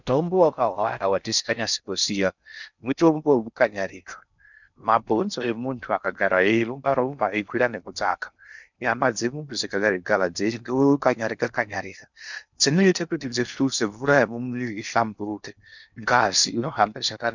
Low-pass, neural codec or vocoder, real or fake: 7.2 kHz; codec, 16 kHz in and 24 kHz out, 0.6 kbps, FocalCodec, streaming, 4096 codes; fake